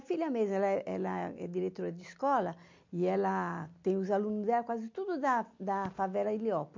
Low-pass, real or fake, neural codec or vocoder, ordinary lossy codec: 7.2 kHz; real; none; MP3, 48 kbps